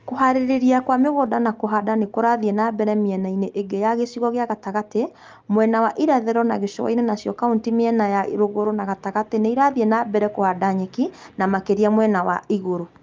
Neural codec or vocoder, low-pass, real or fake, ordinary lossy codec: none; 7.2 kHz; real; Opus, 24 kbps